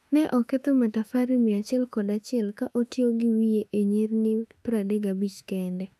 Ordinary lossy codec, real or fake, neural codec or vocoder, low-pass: none; fake; autoencoder, 48 kHz, 32 numbers a frame, DAC-VAE, trained on Japanese speech; 14.4 kHz